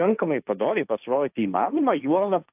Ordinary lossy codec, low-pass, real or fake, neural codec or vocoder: AAC, 32 kbps; 3.6 kHz; fake; codec, 16 kHz, 1.1 kbps, Voila-Tokenizer